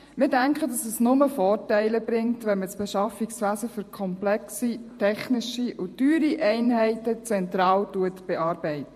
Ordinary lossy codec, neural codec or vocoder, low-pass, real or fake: MP3, 64 kbps; vocoder, 48 kHz, 128 mel bands, Vocos; 14.4 kHz; fake